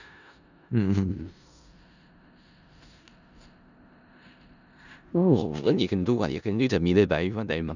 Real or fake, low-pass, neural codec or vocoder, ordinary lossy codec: fake; 7.2 kHz; codec, 16 kHz in and 24 kHz out, 0.4 kbps, LongCat-Audio-Codec, four codebook decoder; none